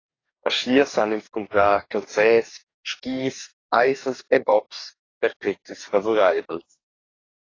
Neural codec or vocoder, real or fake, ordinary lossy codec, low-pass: codec, 44.1 kHz, 2.6 kbps, DAC; fake; AAC, 32 kbps; 7.2 kHz